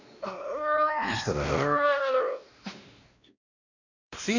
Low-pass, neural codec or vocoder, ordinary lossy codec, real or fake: 7.2 kHz; codec, 16 kHz, 1 kbps, X-Codec, WavLM features, trained on Multilingual LibriSpeech; none; fake